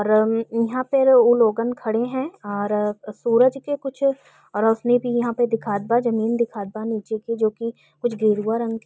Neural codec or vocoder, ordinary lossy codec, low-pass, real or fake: none; none; none; real